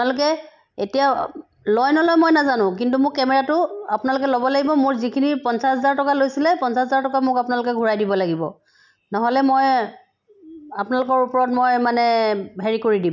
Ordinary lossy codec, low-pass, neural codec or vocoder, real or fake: none; 7.2 kHz; none; real